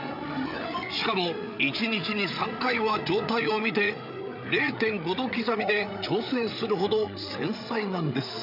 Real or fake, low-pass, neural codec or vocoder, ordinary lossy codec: fake; 5.4 kHz; codec, 16 kHz, 8 kbps, FreqCodec, larger model; none